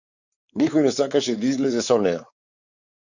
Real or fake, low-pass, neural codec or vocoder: fake; 7.2 kHz; codec, 16 kHz, 4 kbps, X-Codec, WavLM features, trained on Multilingual LibriSpeech